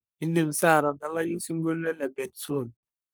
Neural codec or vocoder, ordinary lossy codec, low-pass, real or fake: codec, 44.1 kHz, 3.4 kbps, Pupu-Codec; none; none; fake